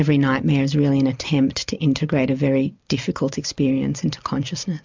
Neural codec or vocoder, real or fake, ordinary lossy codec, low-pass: none; real; MP3, 64 kbps; 7.2 kHz